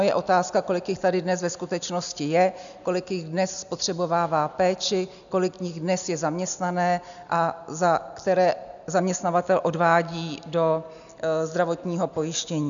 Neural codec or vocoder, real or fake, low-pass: none; real; 7.2 kHz